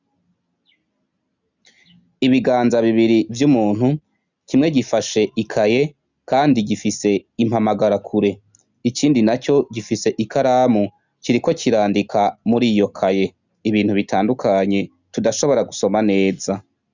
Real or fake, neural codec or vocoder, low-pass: real; none; 7.2 kHz